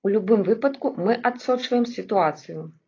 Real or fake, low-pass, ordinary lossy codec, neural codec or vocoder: real; 7.2 kHz; AAC, 32 kbps; none